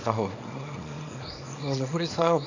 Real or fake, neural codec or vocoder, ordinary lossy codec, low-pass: fake; codec, 24 kHz, 0.9 kbps, WavTokenizer, small release; AAC, 48 kbps; 7.2 kHz